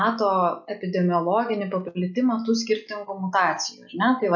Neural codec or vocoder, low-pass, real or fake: none; 7.2 kHz; real